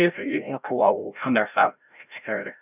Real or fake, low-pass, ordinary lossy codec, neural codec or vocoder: fake; 3.6 kHz; none; codec, 16 kHz, 0.5 kbps, FreqCodec, larger model